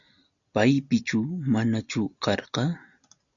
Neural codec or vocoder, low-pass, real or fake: none; 7.2 kHz; real